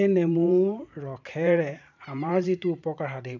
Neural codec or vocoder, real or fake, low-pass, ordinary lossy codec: vocoder, 44.1 kHz, 128 mel bands every 512 samples, BigVGAN v2; fake; 7.2 kHz; none